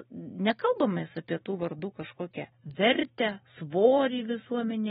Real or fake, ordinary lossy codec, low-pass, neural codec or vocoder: fake; AAC, 16 kbps; 19.8 kHz; vocoder, 44.1 kHz, 128 mel bands every 512 samples, BigVGAN v2